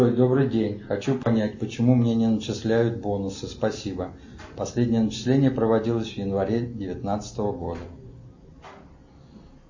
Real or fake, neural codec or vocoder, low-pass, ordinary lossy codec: real; none; 7.2 kHz; MP3, 32 kbps